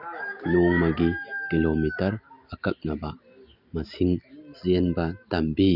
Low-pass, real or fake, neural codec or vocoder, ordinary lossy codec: 5.4 kHz; real; none; none